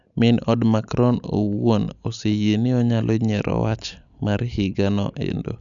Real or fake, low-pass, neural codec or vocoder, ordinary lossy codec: real; 7.2 kHz; none; none